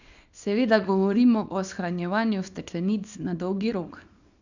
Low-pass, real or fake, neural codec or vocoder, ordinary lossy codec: 7.2 kHz; fake; codec, 24 kHz, 0.9 kbps, WavTokenizer, small release; none